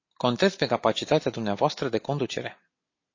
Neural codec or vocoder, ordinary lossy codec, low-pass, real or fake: none; MP3, 32 kbps; 7.2 kHz; real